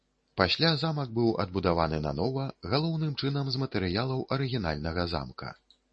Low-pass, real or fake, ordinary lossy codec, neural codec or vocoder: 10.8 kHz; real; MP3, 32 kbps; none